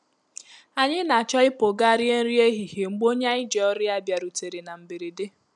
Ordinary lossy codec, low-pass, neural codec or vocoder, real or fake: none; none; none; real